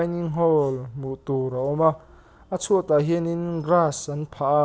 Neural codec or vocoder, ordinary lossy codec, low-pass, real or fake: none; none; none; real